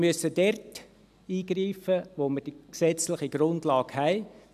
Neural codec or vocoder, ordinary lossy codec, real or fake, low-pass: none; none; real; 14.4 kHz